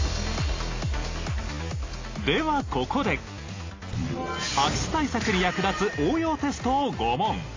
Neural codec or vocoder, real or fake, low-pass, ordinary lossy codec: none; real; 7.2 kHz; AAC, 32 kbps